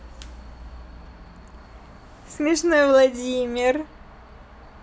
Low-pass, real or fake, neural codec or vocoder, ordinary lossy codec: none; real; none; none